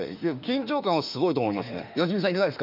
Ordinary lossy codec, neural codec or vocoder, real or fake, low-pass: none; autoencoder, 48 kHz, 32 numbers a frame, DAC-VAE, trained on Japanese speech; fake; 5.4 kHz